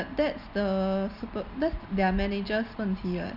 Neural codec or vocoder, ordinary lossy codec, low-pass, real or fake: none; none; 5.4 kHz; real